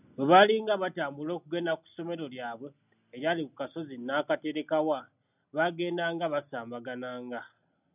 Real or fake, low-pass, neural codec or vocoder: real; 3.6 kHz; none